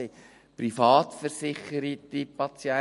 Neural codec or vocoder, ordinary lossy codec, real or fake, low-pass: none; MP3, 48 kbps; real; 14.4 kHz